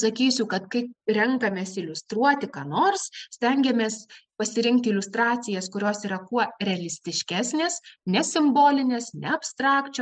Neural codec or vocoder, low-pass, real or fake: none; 9.9 kHz; real